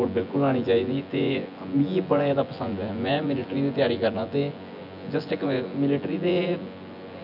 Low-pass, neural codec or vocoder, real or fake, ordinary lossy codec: 5.4 kHz; vocoder, 24 kHz, 100 mel bands, Vocos; fake; none